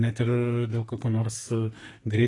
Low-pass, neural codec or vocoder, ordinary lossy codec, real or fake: 10.8 kHz; codec, 44.1 kHz, 2.6 kbps, SNAC; AAC, 32 kbps; fake